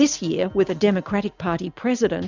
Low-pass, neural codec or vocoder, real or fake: 7.2 kHz; none; real